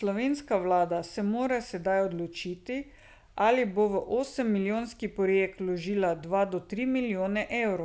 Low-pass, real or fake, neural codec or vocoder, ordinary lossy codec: none; real; none; none